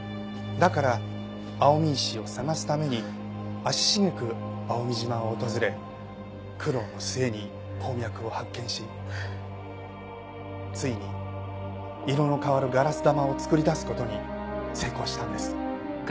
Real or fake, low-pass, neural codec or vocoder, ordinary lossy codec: real; none; none; none